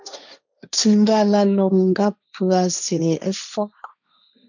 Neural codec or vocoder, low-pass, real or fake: codec, 16 kHz, 1.1 kbps, Voila-Tokenizer; 7.2 kHz; fake